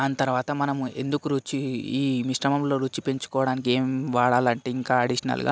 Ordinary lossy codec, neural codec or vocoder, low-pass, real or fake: none; none; none; real